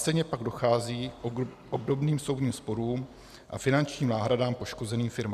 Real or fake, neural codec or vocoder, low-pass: real; none; 14.4 kHz